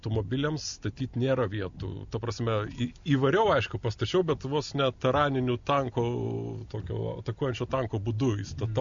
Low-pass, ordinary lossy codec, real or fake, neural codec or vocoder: 7.2 kHz; AAC, 64 kbps; real; none